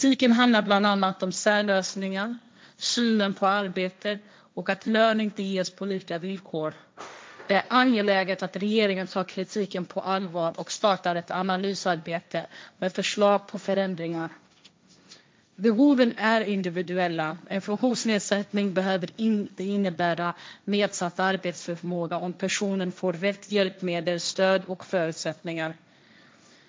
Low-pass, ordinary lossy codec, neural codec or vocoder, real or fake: none; none; codec, 16 kHz, 1.1 kbps, Voila-Tokenizer; fake